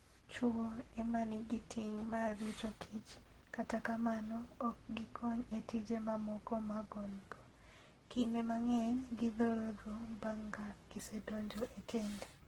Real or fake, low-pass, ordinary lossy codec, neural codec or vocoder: fake; 19.8 kHz; Opus, 16 kbps; codec, 44.1 kHz, 7.8 kbps, Pupu-Codec